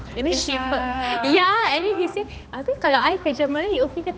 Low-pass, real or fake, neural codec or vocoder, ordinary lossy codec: none; fake; codec, 16 kHz, 2 kbps, X-Codec, HuBERT features, trained on balanced general audio; none